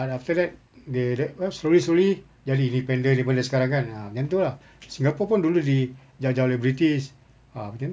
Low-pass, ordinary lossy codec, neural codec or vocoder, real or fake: none; none; none; real